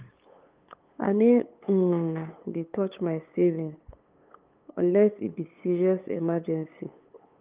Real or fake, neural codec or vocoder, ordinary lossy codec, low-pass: fake; codec, 16 kHz, 8 kbps, FunCodec, trained on LibriTTS, 25 frames a second; Opus, 32 kbps; 3.6 kHz